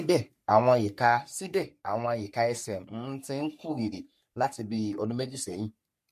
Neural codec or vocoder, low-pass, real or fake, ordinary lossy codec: codec, 44.1 kHz, 3.4 kbps, Pupu-Codec; 14.4 kHz; fake; MP3, 64 kbps